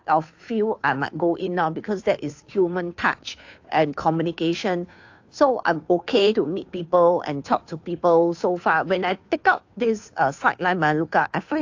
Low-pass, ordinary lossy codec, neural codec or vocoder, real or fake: 7.2 kHz; AAC, 48 kbps; codec, 16 kHz, 2 kbps, FunCodec, trained on Chinese and English, 25 frames a second; fake